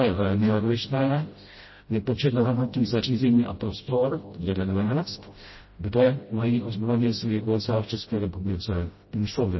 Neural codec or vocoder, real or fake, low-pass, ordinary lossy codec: codec, 16 kHz, 0.5 kbps, FreqCodec, smaller model; fake; 7.2 kHz; MP3, 24 kbps